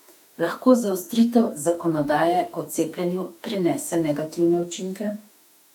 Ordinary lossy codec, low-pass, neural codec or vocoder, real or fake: none; 19.8 kHz; autoencoder, 48 kHz, 32 numbers a frame, DAC-VAE, trained on Japanese speech; fake